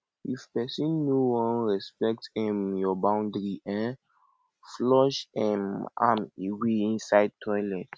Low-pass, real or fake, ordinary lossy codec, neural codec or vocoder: none; real; none; none